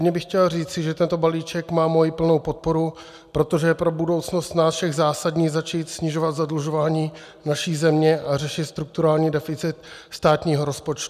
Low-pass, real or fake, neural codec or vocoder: 14.4 kHz; real; none